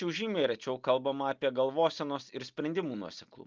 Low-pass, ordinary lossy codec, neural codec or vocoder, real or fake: 7.2 kHz; Opus, 32 kbps; none; real